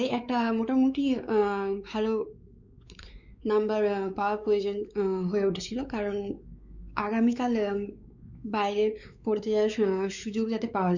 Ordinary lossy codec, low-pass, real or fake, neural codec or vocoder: Opus, 64 kbps; 7.2 kHz; fake; codec, 16 kHz, 4 kbps, X-Codec, WavLM features, trained on Multilingual LibriSpeech